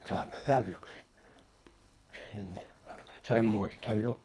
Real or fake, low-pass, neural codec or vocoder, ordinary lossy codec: fake; none; codec, 24 kHz, 1.5 kbps, HILCodec; none